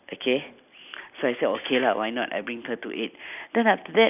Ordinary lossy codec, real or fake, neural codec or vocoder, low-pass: none; real; none; 3.6 kHz